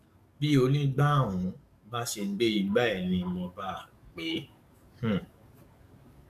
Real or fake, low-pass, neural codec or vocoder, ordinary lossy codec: fake; 14.4 kHz; codec, 44.1 kHz, 7.8 kbps, DAC; none